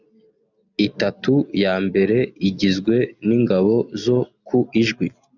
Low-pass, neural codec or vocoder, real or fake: 7.2 kHz; none; real